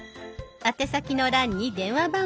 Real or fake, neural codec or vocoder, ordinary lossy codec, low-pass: real; none; none; none